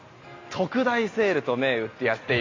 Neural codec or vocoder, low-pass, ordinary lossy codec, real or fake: none; 7.2 kHz; AAC, 32 kbps; real